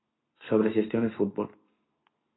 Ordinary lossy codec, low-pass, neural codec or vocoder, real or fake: AAC, 16 kbps; 7.2 kHz; none; real